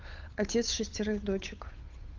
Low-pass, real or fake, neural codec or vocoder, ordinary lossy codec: 7.2 kHz; fake; codec, 16 kHz, 8 kbps, FunCodec, trained on Chinese and English, 25 frames a second; Opus, 24 kbps